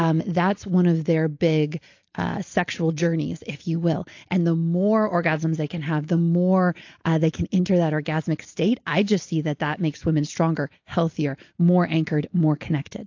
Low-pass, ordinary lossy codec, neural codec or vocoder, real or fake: 7.2 kHz; AAC, 48 kbps; none; real